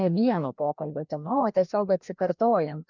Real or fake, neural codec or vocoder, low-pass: fake; codec, 16 kHz, 1 kbps, FreqCodec, larger model; 7.2 kHz